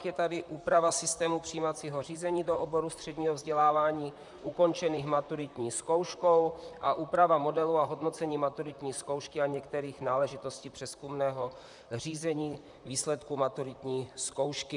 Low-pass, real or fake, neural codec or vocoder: 10.8 kHz; fake; vocoder, 44.1 kHz, 128 mel bands, Pupu-Vocoder